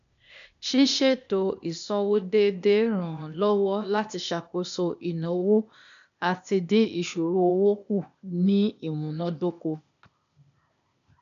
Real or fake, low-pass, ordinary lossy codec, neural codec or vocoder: fake; 7.2 kHz; none; codec, 16 kHz, 0.8 kbps, ZipCodec